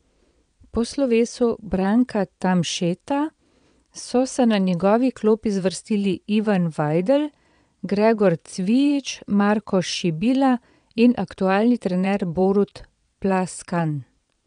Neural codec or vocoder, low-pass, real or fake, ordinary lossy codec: vocoder, 22.05 kHz, 80 mel bands, Vocos; 9.9 kHz; fake; none